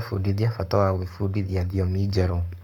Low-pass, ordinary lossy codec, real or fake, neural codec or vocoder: 19.8 kHz; none; fake; codec, 44.1 kHz, 7.8 kbps, Pupu-Codec